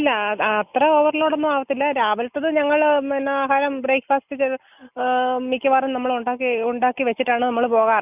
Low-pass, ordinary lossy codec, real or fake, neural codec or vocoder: 3.6 kHz; none; real; none